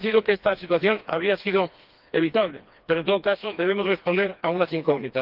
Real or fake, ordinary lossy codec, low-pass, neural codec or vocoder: fake; Opus, 32 kbps; 5.4 kHz; codec, 16 kHz, 2 kbps, FreqCodec, smaller model